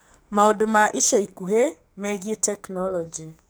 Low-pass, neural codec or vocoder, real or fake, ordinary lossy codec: none; codec, 44.1 kHz, 2.6 kbps, SNAC; fake; none